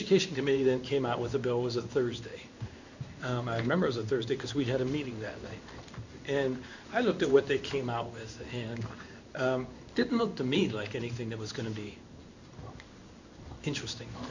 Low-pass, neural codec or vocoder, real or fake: 7.2 kHz; codec, 16 kHz in and 24 kHz out, 1 kbps, XY-Tokenizer; fake